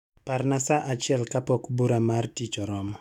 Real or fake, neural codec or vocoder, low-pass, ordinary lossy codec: fake; autoencoder, 48 kHz, 128 numbers a frame, DAC-VAE, trained on Japanese speech; 19.8 kHz; none